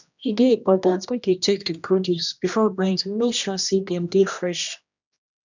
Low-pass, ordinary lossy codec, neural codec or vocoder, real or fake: 7.2 kHz; none; codec, 16 kHz, 1 kbps, X-Codec, HuBERT features, trained on general audio; fake